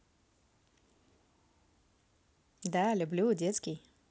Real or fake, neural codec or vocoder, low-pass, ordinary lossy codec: real; none; none; none